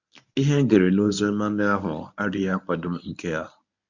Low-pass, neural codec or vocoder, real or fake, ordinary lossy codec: 7.2 kHz; codec, 24 kHz, 0.9 kbps, WavTokenizer, medium speech release version 1; fake; none